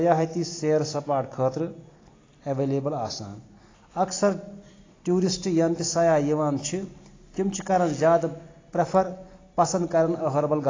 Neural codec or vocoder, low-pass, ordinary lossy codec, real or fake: none; 7.2 kHz; AAC, 32 kbps; real